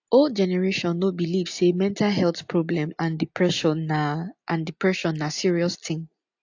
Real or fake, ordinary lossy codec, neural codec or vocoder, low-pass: real; AAC, 48 kbps; none; 7.2 kHz